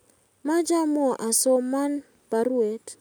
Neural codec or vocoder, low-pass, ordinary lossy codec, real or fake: none; none; none; real